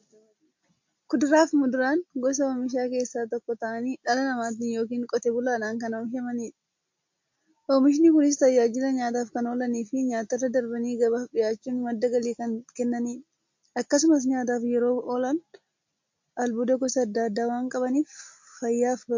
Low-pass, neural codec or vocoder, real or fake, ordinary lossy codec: 7.2 kHz; none; real; MP3, 48 kbps